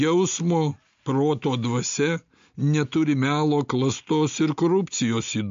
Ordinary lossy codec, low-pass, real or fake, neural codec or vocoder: MP3, 48 kbps; 7.2 kHz; real; none